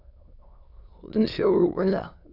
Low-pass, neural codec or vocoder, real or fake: 5.4 kHz; autoencoder, 22.05 kHz, a latent of 192 numbers a frame, VITS, trained on many speakers; fake